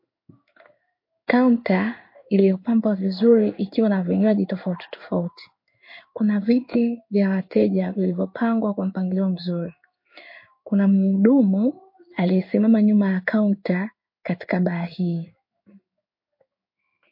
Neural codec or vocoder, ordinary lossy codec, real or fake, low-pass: codec, 16 kHz in and 24 kHz out, 1 kbps, XY-Tokenizer; MP3, 32 kbps; fake; 5.4 kHz